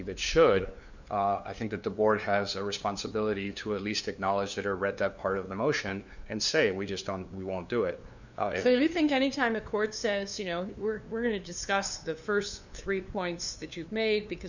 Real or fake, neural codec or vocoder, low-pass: fake; codec, 16 kHz, 2 kbps, FunCodec, trained on LibriTTS, 25 frames a second; 7.2 kHz